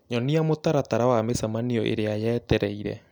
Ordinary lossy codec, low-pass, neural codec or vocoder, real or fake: none; 19.8 kHz; none; real